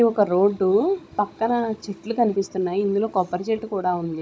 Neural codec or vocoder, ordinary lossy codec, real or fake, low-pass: codec, 16 kHz, 16 kbps, FunCodec, trained on Chinese and English, 50 frames a second; none; fake; none